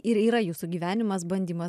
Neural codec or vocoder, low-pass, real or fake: none; 14.4 kHz; real